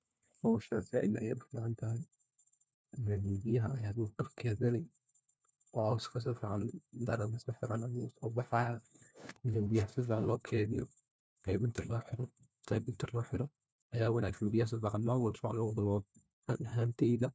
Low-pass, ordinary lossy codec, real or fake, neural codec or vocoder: none; none; fake; codec, 16 kHz, 1 kbps, FunCodec, trained on LibriTTS, 50 frames a second